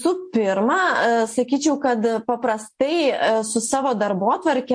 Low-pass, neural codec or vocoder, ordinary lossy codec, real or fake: 9.9 kHz; none; MP3, 48 kbps; real